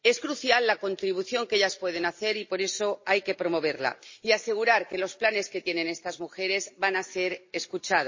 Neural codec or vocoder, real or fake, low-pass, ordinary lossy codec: none; real; 7.2 kHz; MP3, 32 kbps